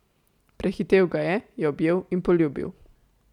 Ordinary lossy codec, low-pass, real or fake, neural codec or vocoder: MP3, 96 kbps; 19.8 kHz; real; none